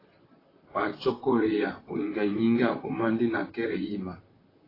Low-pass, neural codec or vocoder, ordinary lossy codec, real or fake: 5.4 kHz; vocoder, 44.1 kHz, 128 mel bands, Pupu-Vocoder; AAC, 24 kbps; fake